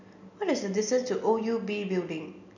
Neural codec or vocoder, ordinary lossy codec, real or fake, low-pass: none; none; real; 7.2 kHz